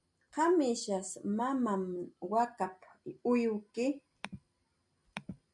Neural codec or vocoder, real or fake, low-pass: none; real; 10.8 kHz